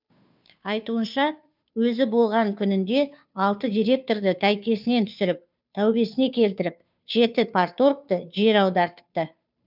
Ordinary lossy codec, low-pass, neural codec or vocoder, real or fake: none; 5.4 kHz; codec, 16 kHz, 2 kbps, FunCodec, trained on Chinese and English, 25 frames a second; fake